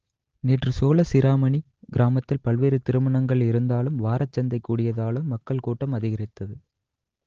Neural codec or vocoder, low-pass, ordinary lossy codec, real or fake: none; 7.2 kHz; Opus, 16 kbps; real